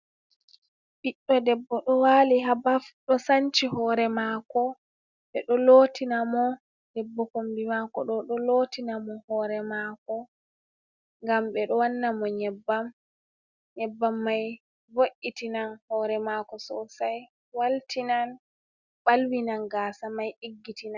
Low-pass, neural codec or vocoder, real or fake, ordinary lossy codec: 7.2 kHz; none; real; Opus, 64 kbps